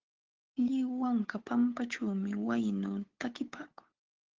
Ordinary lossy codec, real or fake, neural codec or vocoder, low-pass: Opus, 16 kbps; fake; codec, 16 kHz, 8 kbps, FunCodec, trained on Chinese and English, 25 frames a second; 7.2 kHz